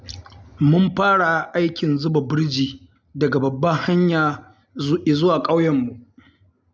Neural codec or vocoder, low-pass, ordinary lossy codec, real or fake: none; none; none; real